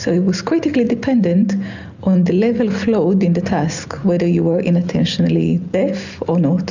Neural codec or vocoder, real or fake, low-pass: none; real; 7.2 kHz